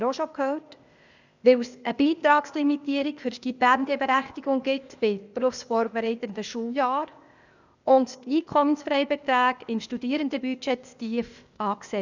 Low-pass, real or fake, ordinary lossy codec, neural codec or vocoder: 7.2 kHz; fake; none; codec, 16 kHz, 0.8 kbps, ZipCodec